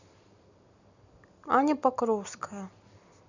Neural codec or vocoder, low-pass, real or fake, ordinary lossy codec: none; 7.2 kHz; real; none